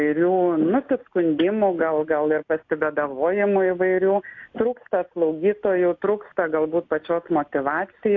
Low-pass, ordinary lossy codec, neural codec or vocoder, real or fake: 7.2 kHz; AAC, 48 kbps; none; real